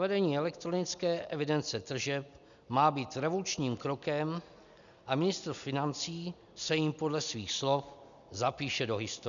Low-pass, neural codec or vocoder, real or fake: 7.2 kHz; none; real